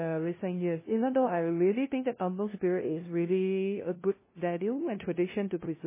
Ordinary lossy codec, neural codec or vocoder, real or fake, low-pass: MP3, 16 kbps; codec, 16 kHz, 0.5 kbps, FunCodec, trained on LibriTTS, 25 frames a second; fake; 3.6 kHz